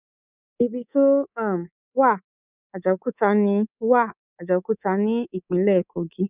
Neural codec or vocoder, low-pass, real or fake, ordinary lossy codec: codec, 24 kHz, 3.1 kbps, DualCodec; 3.6 kHz; fake; none